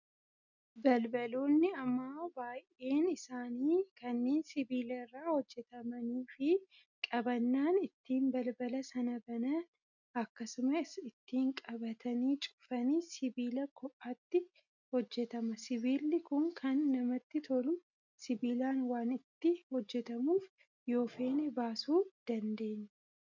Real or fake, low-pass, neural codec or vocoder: real; 7.2 kHz; none